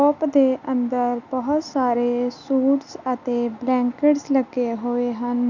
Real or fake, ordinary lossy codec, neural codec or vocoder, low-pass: real; none; none; 7.2 kHz